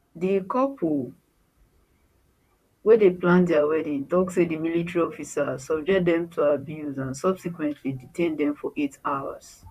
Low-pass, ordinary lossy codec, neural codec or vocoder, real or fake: 14.4 kHz; none; vocoder, 44.1 kHz, 128 mel bands, Pupu-Vocoder; fake